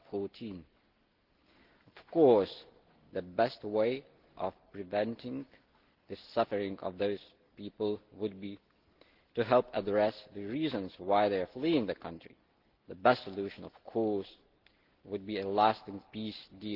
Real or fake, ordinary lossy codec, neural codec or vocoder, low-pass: real; Opus, 16 kbps; none; 5.4 kHz